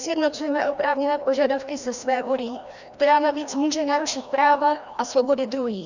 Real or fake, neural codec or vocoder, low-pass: fake; codec, 16 kHz, 1 kbps, FreqCodec, larger model; 7.2 kHz